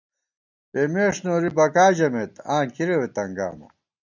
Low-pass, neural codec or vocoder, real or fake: 7.2 kHz; none; real